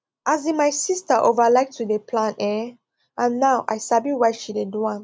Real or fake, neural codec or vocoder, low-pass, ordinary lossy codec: real; none; none; none